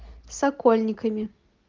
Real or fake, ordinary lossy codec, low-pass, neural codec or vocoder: real; Opus, 24 kbps; 7.2 kHz; none